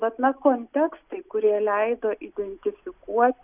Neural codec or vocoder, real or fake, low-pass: none; real; 3.6 kHz